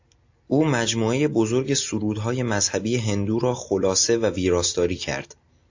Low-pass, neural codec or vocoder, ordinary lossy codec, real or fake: 7.2 kHz; none; AAC, 48 kbps; real